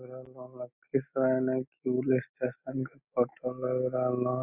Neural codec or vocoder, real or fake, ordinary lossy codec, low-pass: none; real; none; 3.6 kHz